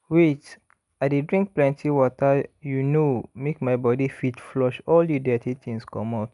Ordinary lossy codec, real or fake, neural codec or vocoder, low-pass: none; real; none; 10.8 kHz